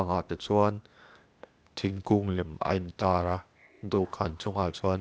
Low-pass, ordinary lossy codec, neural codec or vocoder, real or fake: none; none; codec, 16 kHz, 0.8 kbps, ZipCodec; fake